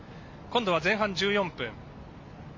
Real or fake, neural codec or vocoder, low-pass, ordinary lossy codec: real; none; 7.2 kHz; MP3, 48 kbps